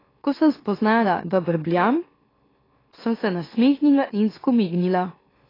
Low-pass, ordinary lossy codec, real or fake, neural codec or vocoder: 5.4 kHz; AAC, 24 kbps; fake; autoencoder, 44.1 kHz, a latent of 192 numbers a frame, MeloTTS